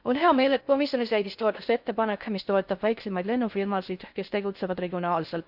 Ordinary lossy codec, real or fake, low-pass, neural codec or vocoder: none; fake; 5.4 kHz; codec, 16 kHz in and 24 kHz out, 0.6 kbps, FocalCodec, streaming, 4096 codes